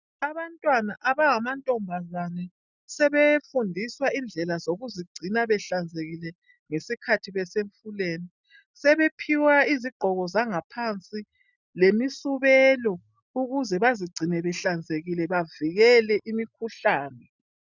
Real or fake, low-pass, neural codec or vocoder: real; 7.2 kHz; none